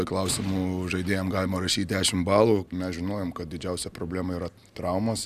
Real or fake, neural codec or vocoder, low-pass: real; none; 14.4 kHz